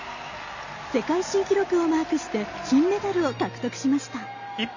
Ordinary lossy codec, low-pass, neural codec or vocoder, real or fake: none; 7.2 kHz; none; real